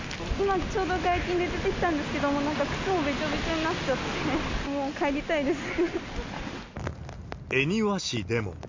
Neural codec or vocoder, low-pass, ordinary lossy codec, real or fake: none; 7.2 kHz; MP3, 48 kbps; real